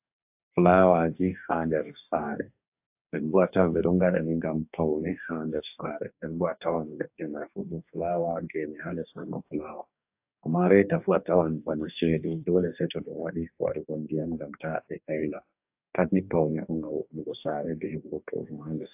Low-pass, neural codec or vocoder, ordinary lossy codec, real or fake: 3.6 kHz; codec, 44.1 kHz, 2.6 kbps, DAC; AAC, 32 kbps; fake